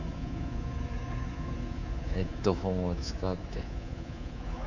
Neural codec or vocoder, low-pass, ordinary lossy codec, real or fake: codec, 24 kHz, 3.1 kbps, DualCodec; 7.2 kHz; none; fake